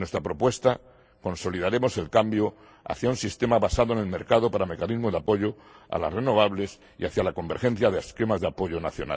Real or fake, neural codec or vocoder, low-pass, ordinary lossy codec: real; none; none; none